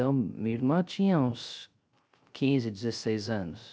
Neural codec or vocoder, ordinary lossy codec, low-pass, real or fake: codec, 16 kHz, 0.3 kbps, FocalCodec; none; none; fake